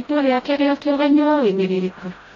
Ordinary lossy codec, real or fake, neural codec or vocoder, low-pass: AAC, 32 kbps; fake; codec, 16 kHz, 0.5 kbps, FreqCodec, smaller model; 7.2 kHz